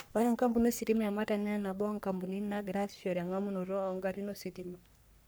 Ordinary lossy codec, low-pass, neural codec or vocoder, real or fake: none; none; codec, 44.1 kHz, 3.4 kbps, Pupu-Codec; fake